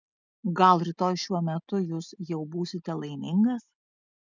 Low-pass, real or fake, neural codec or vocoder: 7.2 kHz; real; none